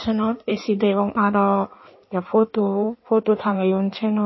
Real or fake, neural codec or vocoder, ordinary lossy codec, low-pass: fake; codec, 16 kHz in and 24 kHz out, 1.1 kbps, FireRedTTS-2 codec; MP3, 24 kbps; 7.2 kHz